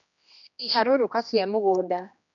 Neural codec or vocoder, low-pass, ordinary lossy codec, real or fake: codec, 16 kHz, 1 kbps, X-Codec, HuBERT features, trained on general audio; 7.2 kHz; none; fake